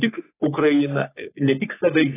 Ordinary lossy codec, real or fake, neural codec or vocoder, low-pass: AAC, 16 kbps; fake; codec, 44.1 kHz, 3.4 kbps, Pupu-Codec; 3.6 kHz